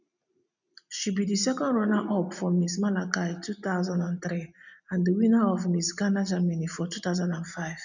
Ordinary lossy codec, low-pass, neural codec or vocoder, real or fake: none; 7.2 kHz; none; real